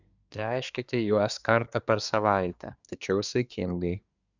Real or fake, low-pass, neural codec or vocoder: fake; 7.2 kHz; codec, 24 kHz, 1 kbps, SNAC